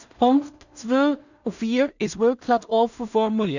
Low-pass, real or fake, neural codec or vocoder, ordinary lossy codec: 7.2 kHz; fake; codec, 16 kHz in and 24 kHz out, 0.4 kbps, LongCat-Audio-Codec, two codebook decoder; none